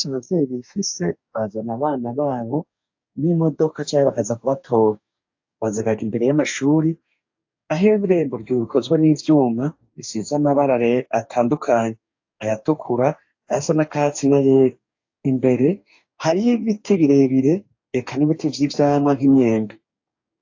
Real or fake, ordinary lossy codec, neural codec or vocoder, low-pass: fake; AAC, 48 kbps; codec, 44.1 kHz, 2.6 kbps, DAC; 7.2 kHz